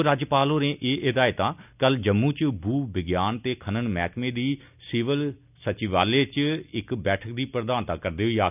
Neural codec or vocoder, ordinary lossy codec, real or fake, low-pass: none; none; real; 3.6 kHz